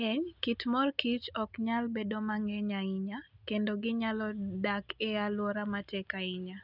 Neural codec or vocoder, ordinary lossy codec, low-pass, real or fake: none; none; 5.4 kHz; real